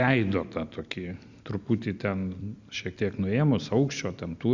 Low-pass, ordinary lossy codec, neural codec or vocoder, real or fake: 7.2 kHz; Opus, 64 kbps; none; real